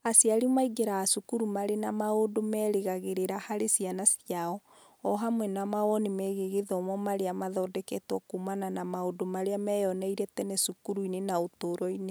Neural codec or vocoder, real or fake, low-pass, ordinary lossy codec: none; real; none; none